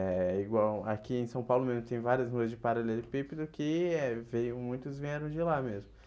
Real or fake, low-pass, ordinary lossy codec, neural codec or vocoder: real; none; none; none